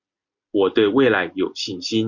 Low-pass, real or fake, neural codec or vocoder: 7.2 kHz; real; none